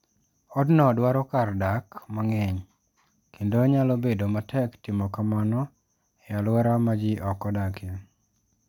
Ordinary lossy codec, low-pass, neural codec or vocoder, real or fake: MP3, 96 kbps; 19.8 kHz; none; real